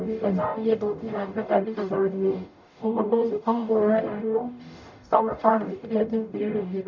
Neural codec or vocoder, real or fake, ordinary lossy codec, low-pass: codec, 44.1 kHz, 0.9 kbps, DAC; fake; none; 7.2 kHz